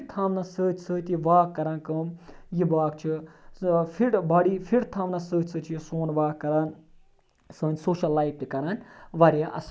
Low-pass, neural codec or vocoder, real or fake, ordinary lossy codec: none; none; real; none